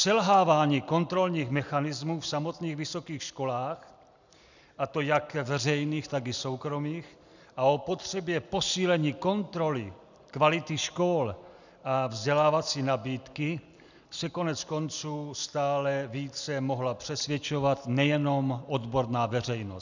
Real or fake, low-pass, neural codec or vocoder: real; 7.2 kHz; none